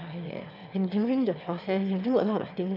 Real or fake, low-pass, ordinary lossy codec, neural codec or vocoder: fake; 5.4 kHz; none; autoencoder, 22.05 kHz, a latent of 192 numbers a frame, VITS, trained on one speaker